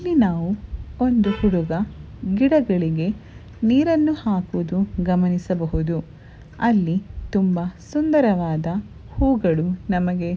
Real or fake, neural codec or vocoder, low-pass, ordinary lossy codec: real; none; none; none